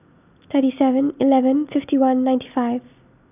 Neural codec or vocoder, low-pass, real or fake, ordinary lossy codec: none; 3.6 kHz; real; none